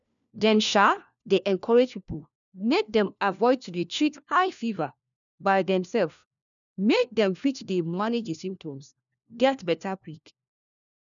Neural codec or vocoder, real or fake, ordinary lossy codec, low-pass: codec, 16 kHz, 1 kbps, FunCodec, trained on LibriTTS, 50 frames a second; fake; none; 7.2 kHz